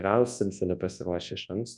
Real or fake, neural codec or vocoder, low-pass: fake; codec, 24 kHz, 0.9 kbps, WavTokenizer, large speech release; 10.8 kHz